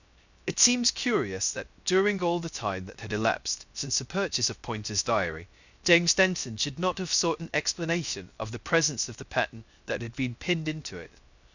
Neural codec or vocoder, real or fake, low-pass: codec, 16 kHz, 0.3 kbps, FocalCodec; fake; 7.2 kHz